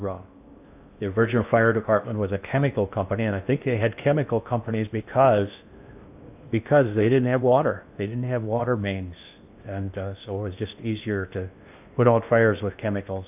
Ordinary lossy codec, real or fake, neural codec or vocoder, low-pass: AAC, 32 kbps; fake; codec, 16 kHz in and 24 kHz out, 0.8 kbps, FocalCodec, streaming, 65536 codes; 3.6 kHz